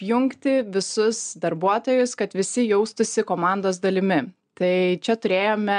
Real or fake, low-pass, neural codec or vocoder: real; 9.9 kHz; none